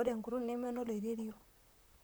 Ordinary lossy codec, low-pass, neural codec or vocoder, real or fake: none; none; vocoder, 44.1 kHz, 128 mel bands, Pupu-Vocoder; fake